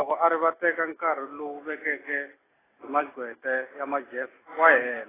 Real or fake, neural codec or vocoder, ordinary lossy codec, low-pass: real; none; AAC, 16 kbps; 3.6 kHz